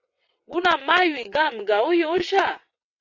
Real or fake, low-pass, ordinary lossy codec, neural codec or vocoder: fake; 7.2 kHz; AAC, 48 kbps; vocoder, 22.05 kHz, 80 mel bands, WaveNeXt